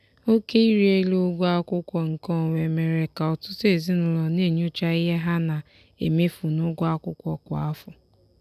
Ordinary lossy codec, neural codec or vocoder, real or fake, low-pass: none; none; real; 14.4 kHz